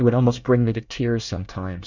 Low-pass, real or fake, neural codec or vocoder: 7.2 kHz; fake; codec, 24 kHz, 1 kbps, SNAC